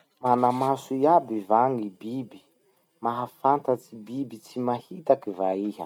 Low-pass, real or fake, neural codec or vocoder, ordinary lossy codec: 19.8 kHz; real; none; none